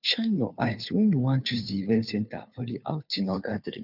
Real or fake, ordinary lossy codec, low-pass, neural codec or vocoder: fake; none; 5.4 kHz; codec, 16 kHz, 2 kbps, FunCodec, trained on Chinese and English, 25 frames a second